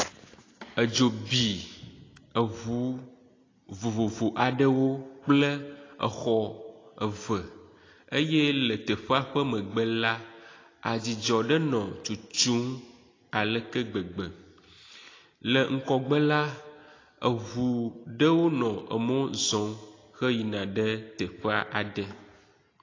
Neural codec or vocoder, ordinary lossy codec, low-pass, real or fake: none; AAC, 32 kbps; 7.2 kHz; real